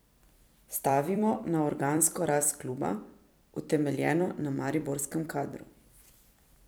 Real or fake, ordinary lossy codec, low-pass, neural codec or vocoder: fake; none; none; vocoder, 44.1 kHz, 128 mel bands every 256 samples, BigVGAN v2